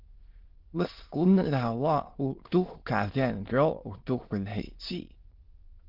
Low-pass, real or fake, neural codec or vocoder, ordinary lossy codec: 5.4 kHz; fake; autoencoder, 22.05 kHz, a latent of 192 numbers a frame, VITS, trained on many speakers; Opus, 16 kbps